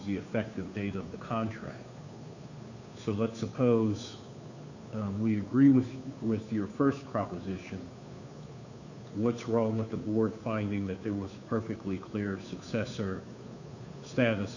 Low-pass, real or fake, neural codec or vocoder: 7.2 kHz; fake; codec, 16 kHz, 2 kbps, FunCodec, trained on Chinese and English, 25 frames a second